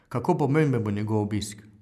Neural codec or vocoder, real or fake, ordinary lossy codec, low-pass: none; real; none; 14.4 kHz